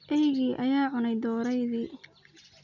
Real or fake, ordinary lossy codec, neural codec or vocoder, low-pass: real; none; none; 7.2 kHz